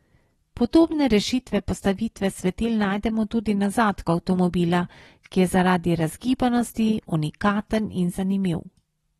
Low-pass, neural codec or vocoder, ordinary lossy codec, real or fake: 19.8 kHz; none; AAC, 32 kbps; real